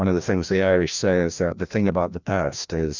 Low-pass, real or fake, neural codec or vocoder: 7.2 kHz; fake; codec, 16 kHz, 1 kbps, FreqCodec, larger model